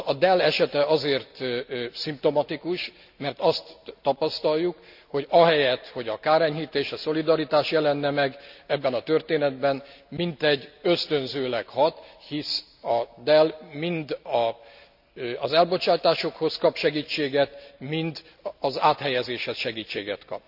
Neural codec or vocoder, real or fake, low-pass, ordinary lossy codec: none; real; 5.4 kHz; none